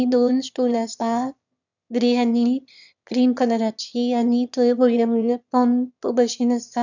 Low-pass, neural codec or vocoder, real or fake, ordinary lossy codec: 7.2 kHz; autoencoder, 22.05 kHz, a latent of 192 numbers a frame, VITS, trained on one speaker; fake; none